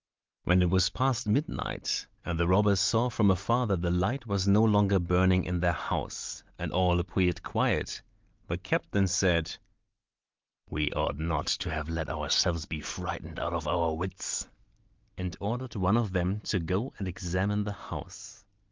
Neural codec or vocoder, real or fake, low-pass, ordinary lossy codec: none; real; 7.2 kHz; Opus, 32 kbps